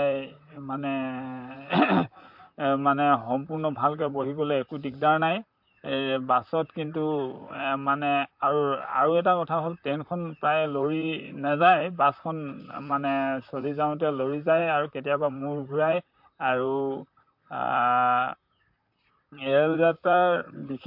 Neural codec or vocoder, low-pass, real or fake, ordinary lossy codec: vocoder, 44.1 kHz, 128 mel bands, Pupu-Vocoder; 5.4 kHz; fake; MP3, 48 kbps